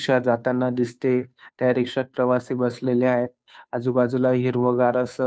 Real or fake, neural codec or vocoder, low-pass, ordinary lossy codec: fake; codec, 16 kHz, 2 kbps, FunCodec, trained on Chinese and English, 25 frames a second; none; none